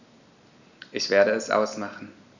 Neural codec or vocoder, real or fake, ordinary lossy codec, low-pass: none; real; none; 7.2 kHz